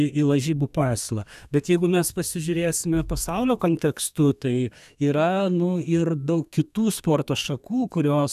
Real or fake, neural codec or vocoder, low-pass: fake; codec, 32 kHz, 1.9 kbps, SNAC; 14.4 kHz